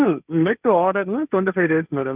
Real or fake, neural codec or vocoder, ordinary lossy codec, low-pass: fake; codec, 16 kHz, 1.1 kbps, Voila-Tokenizer; none; 3.6 kHz